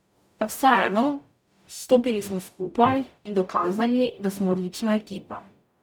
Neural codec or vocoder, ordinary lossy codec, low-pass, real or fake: codec, 44.1 kHz, 0.9 kbps, DAC; none; none; fake